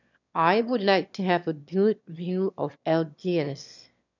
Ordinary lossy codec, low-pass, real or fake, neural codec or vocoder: none; 7.2 kHz; fake; autoencoder, 22.05 kHz, a latent of 192 numbers a frame, VITS, trained on one speaker